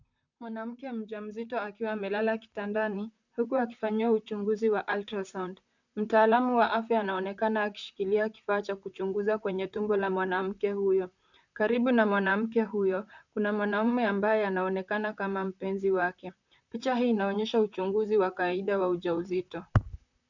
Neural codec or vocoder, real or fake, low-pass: vocoder, 44.1 kHz, 128 mel bands, Pupu-Vocoder; fake; 7.2 kHz